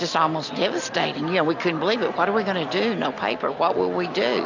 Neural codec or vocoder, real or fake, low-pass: none; real; 7.2 kHz